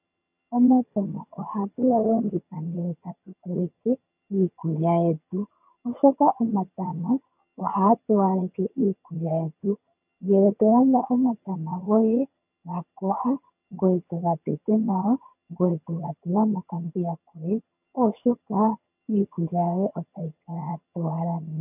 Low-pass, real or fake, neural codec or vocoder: 3.6 kHz; fake; vocoder, 22.05 kHz, 80 mel bands, HiFi-GAN